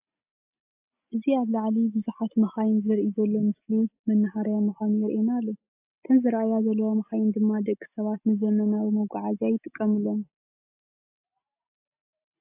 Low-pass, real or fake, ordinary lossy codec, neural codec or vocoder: 3.6 kHz; real; AAC, 32 kbps; none